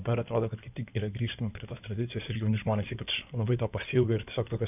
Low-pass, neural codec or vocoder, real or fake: 3.6 kHz; codec, 16 kHz in and 24 kHz out, 2.2 kbps, FireRedTTS-2 codec; fake